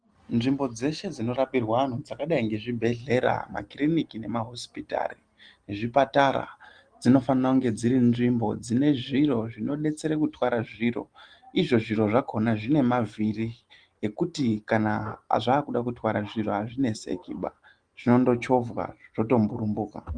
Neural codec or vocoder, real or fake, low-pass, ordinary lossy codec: none; real; 9.9 kHz; Opus, 24 kbps